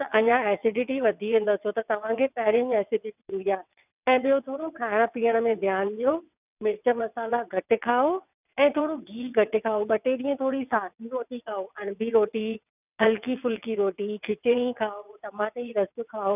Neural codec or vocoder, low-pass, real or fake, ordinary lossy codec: vocoder, 22.05 kHz, 80 mel bands, WaveNeXt; 3.6 kHz; fake; none